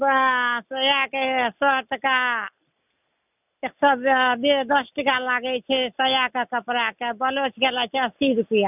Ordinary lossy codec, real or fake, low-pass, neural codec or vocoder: none; real; 3.6 kHz; none